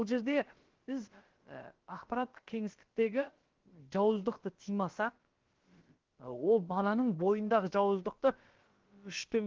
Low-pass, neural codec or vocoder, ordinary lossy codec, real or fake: 7.2 kHz; codec, 16 kHz, about 1 kbps, DyCAST, with the encoder's durations; Opus, 16 kbps; fake